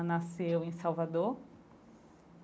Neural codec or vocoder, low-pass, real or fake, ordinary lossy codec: none; none; real; none